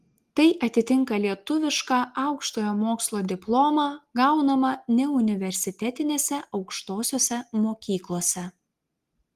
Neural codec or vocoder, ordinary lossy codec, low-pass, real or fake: none; Opus, 24 kbps; 14.4 kHz; real